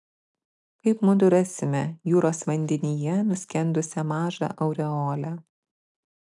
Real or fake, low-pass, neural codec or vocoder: real; 10.8 kHz; none